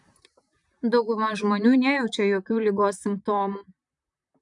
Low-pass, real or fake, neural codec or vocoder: 10.8 kHz; fake; vocoder, 44.1 kHz, 128 mel bands, Pupu-Vocoder